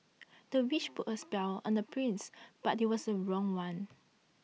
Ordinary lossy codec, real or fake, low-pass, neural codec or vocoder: none; real; none; none